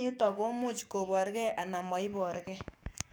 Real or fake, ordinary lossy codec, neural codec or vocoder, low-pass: fake; none; codec, 44.1 kHz, 7.8 kbps, DAC; none